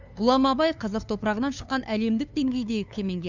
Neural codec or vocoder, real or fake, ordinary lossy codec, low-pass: codec, 16 kHz, 2 kbps, FunCodec, trained on LibriTTS, 25 frames a second; fake; none; 7.2 kHz